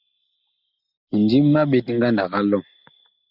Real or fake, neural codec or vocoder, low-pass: real; none; 5.4 kHz